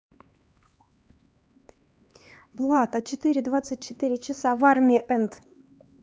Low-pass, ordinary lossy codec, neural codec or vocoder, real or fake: none; none; codec, 16 kHz, 2 kbps, X-Codec, HuBERT features, trained on LibriSpeech; fake